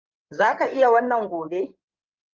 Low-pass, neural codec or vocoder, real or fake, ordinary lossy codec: 7.2 kHz; codec, 16 kHz in and 24 kHz out, 2.2 kbps, FireRedTTS-2 codec; fake; Opus, 24 kbps